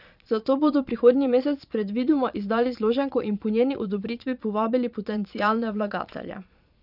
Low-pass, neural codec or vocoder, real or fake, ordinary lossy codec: 5.4 kHz; vocoder, 22.05 kHz, 80 mel bands, Vocos; fake; none